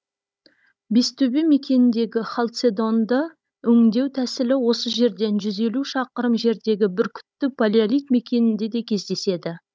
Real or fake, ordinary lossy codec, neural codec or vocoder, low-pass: fake; none; codec, 16 kHz, 16 kbps, FunCodec, trained on Chinese and English, 50 frames a second; none